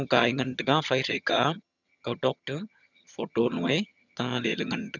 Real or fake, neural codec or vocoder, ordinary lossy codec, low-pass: fake; vocoder, 22.05 kHz, 80 mel bands, HiFi-GAN; none; 7.2 kHz